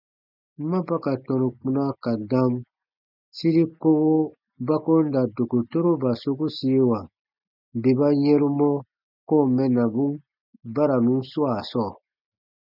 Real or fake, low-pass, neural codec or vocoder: real; 5.4 kHz; none